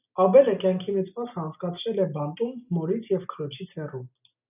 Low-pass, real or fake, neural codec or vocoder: 3.6 kHz; real; none